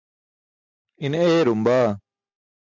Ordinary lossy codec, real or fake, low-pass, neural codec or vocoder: MP3, 64 kbps; real; 7.2 kHz; none